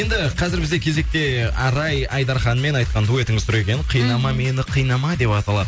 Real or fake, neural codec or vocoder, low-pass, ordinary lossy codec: real; none; none; none